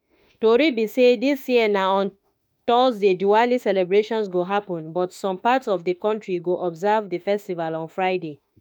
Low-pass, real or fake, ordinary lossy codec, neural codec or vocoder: none; fake; none; autoencoder, 48 kHz, 32 numbers a frame, DAC-VAE, trained on Japanese speech